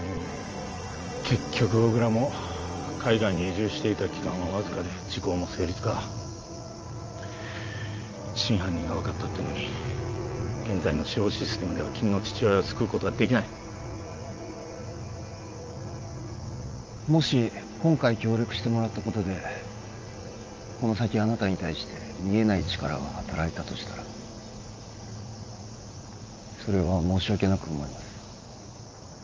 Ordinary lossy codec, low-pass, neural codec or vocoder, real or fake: Opus, 24 kbps; 7.2 kHz; vocoder, 44.1 kHz, 80 mel bands, Vocos; fake